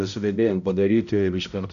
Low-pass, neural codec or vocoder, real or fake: 7.2 kHz; codec, 16 kHz, 0.5 kbps, X-Codec, HuBERT features, trained on balanced general audio; fake